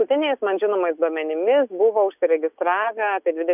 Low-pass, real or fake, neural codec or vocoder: 3.6 kHz; real; none